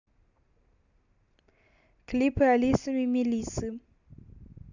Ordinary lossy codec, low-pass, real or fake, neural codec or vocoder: none; 7.2 kHz; real; none